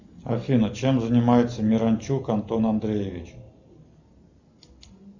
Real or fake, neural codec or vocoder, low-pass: real; none; 7.2 kHz